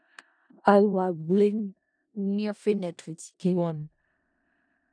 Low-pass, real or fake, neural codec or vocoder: 9.9 kHz; fake; codec, 16 kHz in and 24 kHz out, 0.4 kbps, LongCat-Audio-Codec, four codebook decoder